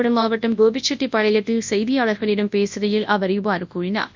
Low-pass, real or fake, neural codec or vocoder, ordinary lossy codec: 7.2 kHz; fake; codec, 24 kHz, 0.9 kbps, WavTokenizer, large speech release; MP3, 64 kbps